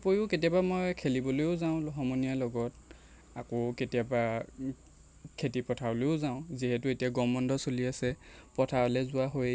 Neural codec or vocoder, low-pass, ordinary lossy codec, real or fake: none; none; none; real